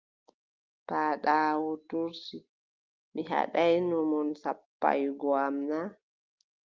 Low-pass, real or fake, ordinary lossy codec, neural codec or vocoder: 7.2 kHz; real; Opus, 32 kbps; none